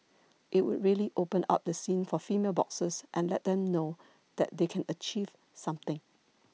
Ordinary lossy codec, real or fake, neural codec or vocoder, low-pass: none; real; none; none